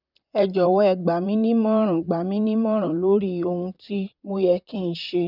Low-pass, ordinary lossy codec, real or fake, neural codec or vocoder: 5.4 kHz; none; fake; vocoder, 44.1 kHz, 128 mel bands, Pupu-Vocoder